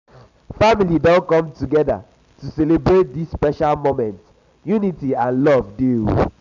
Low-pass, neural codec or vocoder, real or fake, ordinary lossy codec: 7.2 kHz; none; real; none